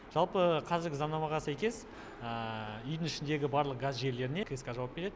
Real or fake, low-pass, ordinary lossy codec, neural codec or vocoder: real; none; none; none